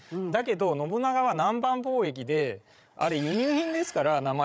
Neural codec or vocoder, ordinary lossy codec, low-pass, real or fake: codec, 16 kHz, 16 kbps, FreqCodec, larger model; none; none; fake